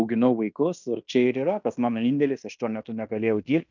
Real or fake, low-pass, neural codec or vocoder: fake; 7.2 kHz; codec, 16 kHz in and 24 kHz out, 0.9 kbps, LongCat-Audio-Codec, fine tuned four codebook decoder